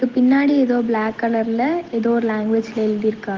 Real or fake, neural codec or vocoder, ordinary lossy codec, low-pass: real; none; Opus, 16 kbps; 7.2 kHz